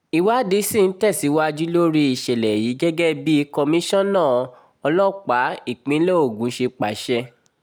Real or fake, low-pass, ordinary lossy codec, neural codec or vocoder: real; none; none; none